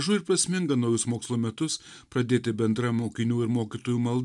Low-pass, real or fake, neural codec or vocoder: 10.8 kHz; real; none